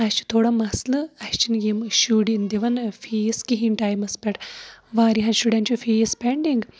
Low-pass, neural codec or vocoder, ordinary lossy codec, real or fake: none; none; none; real